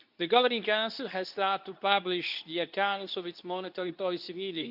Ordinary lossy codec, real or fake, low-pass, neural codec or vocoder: none; fake; 5.4 kHz; codec, 24 kHz, 0.9 kbps, WavTokenizer, medium speech release version 2